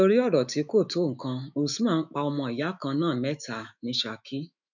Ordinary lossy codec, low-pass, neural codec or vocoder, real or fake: none; 7.2 kHz; autoencoder, 48 kHz, 128 numbers a frame, DAC-VAE, trained on Japanese speech; fake